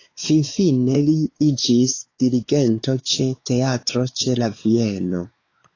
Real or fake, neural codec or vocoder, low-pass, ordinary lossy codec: fake; codec, 16 kHz, 4 kbps, X-Codec, WavLM features, trained on Multilingual LibriSpeech; 7.2 kHz; AAC, 32 kbps